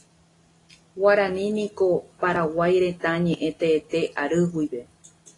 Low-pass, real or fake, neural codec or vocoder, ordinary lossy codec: 10.8 kHz; real; none; AAC, 32 kbps